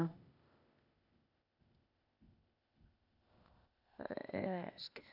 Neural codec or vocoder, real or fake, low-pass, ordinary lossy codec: codec, 16 kHz, 0.8 kbps, ZipCodec; fake; 5.4 kHz; none